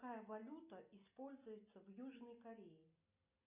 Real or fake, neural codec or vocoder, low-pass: real; none; 3.6 kHz